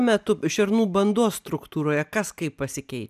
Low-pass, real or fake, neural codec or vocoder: 14.4 kHz; real; none